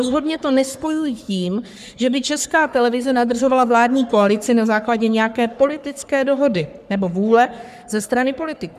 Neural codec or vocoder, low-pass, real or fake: codec, 44.1 kHz, 3.4 kbps, Pupu-Codec; 14.4 kHz; fake